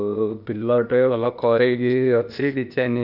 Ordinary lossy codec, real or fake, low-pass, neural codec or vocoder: none; fake; 5.4 kHz; codec, 16 kHz, 0.8 kbps, ZipCodec